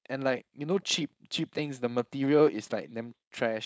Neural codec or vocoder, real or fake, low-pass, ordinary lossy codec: codec, 16 kHz, 4.8 kbps, FACodec; fake; none; none